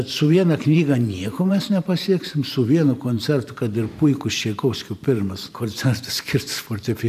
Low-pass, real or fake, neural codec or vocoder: 14.4 kHz; fake; vocoder, 44.1 kHz, 128 mel bands every 512 samples, BigVGAN v2